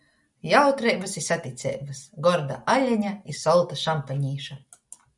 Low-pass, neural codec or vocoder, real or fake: 10.8 kHz; none; real